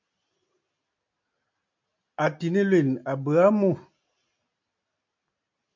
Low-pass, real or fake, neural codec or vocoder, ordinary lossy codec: 7.2 kHz; real; none; MP3, 64 kbps